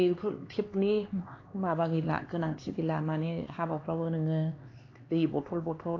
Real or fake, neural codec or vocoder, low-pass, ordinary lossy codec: fake; codec, 16 kHz, 4 kbps, X-Codec, HuBERT features, trained on LibriSpeech; 7.2 kHz; AAC, 32 kbps